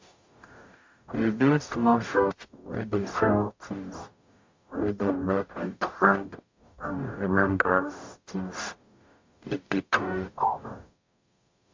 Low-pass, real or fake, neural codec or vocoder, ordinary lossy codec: 7.2 kHz; fake; codec, 44.1 kHz, 0.9 kbps, DAC; MP3, 48 kbps